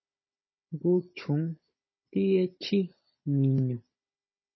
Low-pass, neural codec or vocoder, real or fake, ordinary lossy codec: 7.2 kHz; codec, 16 kHz, 16 kbps, FunCodec, trained on Chinese and English, 50 frames a second; fake; MP3, 24 kbps